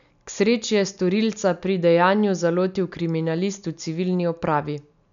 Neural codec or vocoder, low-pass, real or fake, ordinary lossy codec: none; 7.2 kHz; real; none